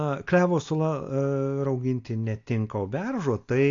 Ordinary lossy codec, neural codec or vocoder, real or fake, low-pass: AAC, 48 kbps; none; real; 7.2 kHz